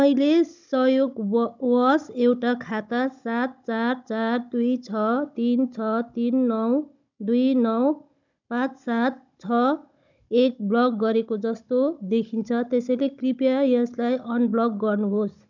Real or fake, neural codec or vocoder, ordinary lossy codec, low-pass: fake; codec, 16 kHz, 16 kbps, FunCodec, trained on Chinese and English, 50 frames a second; none; 7.2 kHz